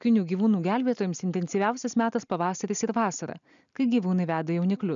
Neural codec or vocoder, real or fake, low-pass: none; real; 7.2 kHz